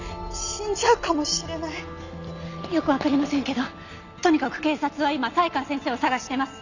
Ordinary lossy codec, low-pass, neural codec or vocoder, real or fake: none; 7.2 kHz; none; real